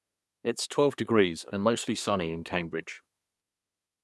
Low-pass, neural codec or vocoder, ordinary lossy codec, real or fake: none; codec, 24 kHz, 1 kbps, SNAC; none; fake